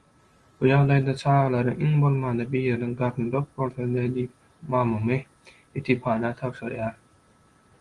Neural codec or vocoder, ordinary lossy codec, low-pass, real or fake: none; Opus, 24 kbps; 10.8 kHz; real